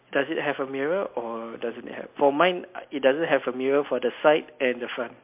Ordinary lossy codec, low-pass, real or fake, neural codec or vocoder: MP3, 32 kbps; 3.6 kHz; real; none